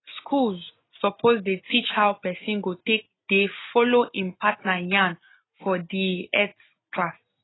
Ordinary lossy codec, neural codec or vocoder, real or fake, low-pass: AAC, 16 kbps; none; real; 7.2 kHz